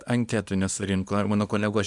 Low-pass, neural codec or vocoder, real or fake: 10.8 kHz; codec, 24 kHz, 0.9 kbps, WavTokenizer, small release; fake